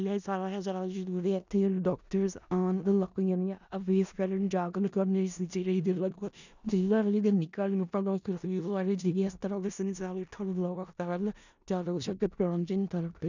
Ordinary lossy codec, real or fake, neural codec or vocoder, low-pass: none; fake; codec, 16 kHz in and 24 kHz out, 0.4 kbps, LongCat-Audio-Codec, four codebook decoder; 7.2 kHz